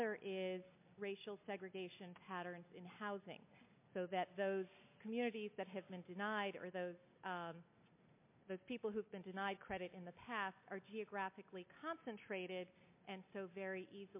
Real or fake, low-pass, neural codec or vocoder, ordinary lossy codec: real; 3.6 kHz; none; MP3, 24 kbps